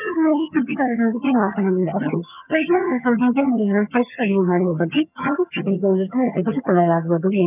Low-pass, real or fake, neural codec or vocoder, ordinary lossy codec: 3.6 kHz; fake; vocoder, 22.05 kHz, 80 mel bands, HiFi-GAN; none